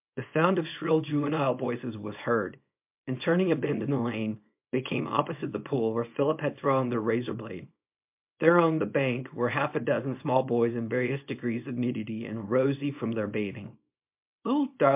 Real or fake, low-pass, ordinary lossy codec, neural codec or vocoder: fake; 3.6 kHz; MP3, 32 kbps; codec, 24 kHz, 0.9 kbps, WavTokenizer, small release